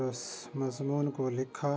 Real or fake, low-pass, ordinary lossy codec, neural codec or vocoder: real; none; none; none